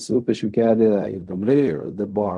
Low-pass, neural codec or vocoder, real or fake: 10.8 kHz; codec, 16 kHz in and 24 kHz out, 0.4 kbps, LongCat-Audio-Codec, fine tuned four codebook decoder; fake